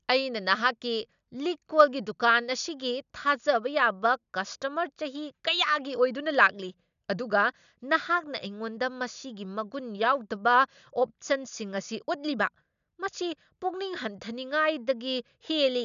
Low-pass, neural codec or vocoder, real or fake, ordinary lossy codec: 7.2 kHz; none; real; none